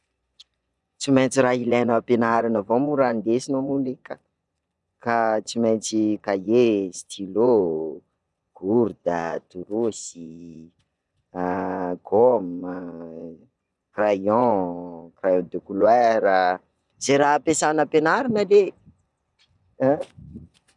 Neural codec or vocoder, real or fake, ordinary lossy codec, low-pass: vocoder, 24 kHz, 100 mel bands, Vocos; fake; none; 10.8 kHz